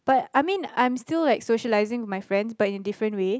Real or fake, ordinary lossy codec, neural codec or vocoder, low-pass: real; none; none; none